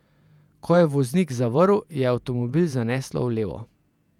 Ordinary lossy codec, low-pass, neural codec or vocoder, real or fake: none; 19.8 kHz; vocoder, 48 kHz, 128 mel bands, Vocos; fake